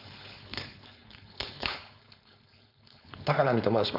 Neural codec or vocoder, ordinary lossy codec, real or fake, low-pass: codec, 16 kHz, 4.8 kbps, FACodec; none; fake; 5.4 kHz